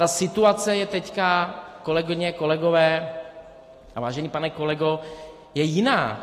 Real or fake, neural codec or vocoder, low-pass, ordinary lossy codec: real; none; 14.4 kHz; AAC, 48 kbps